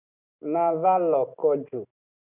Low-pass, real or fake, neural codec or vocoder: 3.6 kHz; real; none